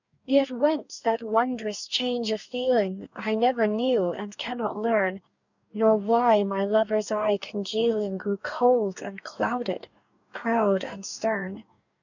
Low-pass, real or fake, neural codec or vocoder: 7.2 kHz; fake; codec, 44.1 kHz, 2.6 kbps, DAC